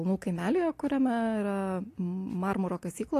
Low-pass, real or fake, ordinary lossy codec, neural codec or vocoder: 14.4 kHz; real; AAC, 48 kbps; none